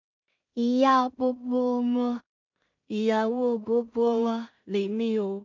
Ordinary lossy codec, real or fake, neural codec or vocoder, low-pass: MP3, 64 kbps; fake; codec, 16 kHz in and 24 kHz out, 0.4 kbps, LongCat-Audio-Codec, two codebook decoder; 7.2 kHz